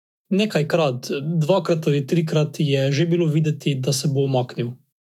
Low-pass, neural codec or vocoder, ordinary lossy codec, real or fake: 19.8 kHz; autoencoder, 48 kHz, 128 numbers a frame, DAC-VAE, trained on Japanese speech; none; fake